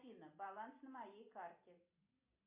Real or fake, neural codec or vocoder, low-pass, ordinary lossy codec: real; none; 3.6 kHz; MP3, 24 kbps